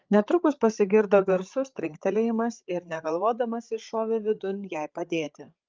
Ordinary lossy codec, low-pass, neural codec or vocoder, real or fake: Opus, 32 kbps; 7.2 kHz; codec, 16 kHz, 8 kbps, FreqCodec, larger model; fake